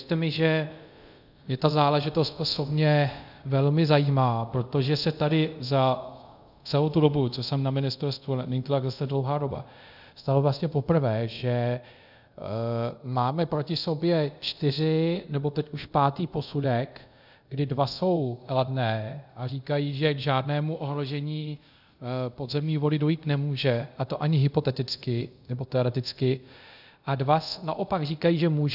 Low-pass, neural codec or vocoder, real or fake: 5.4 kHz; codec, 24 kHz, 0.5 kbps, DualCodec; fake